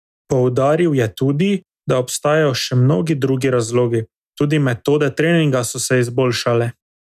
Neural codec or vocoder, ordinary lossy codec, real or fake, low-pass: none; none; real; 14.4 kHz